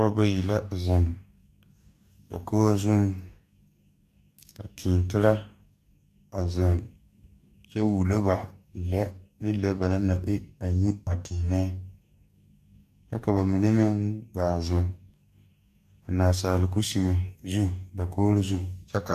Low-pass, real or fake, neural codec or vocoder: 14.4 kHz; fake; codec, 44.1 kHz, 2.6 kbps, DAC